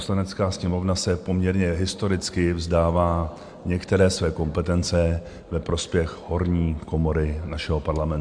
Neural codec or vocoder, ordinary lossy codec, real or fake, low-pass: none; MP3, 64 kbps; real; 9.9 kHz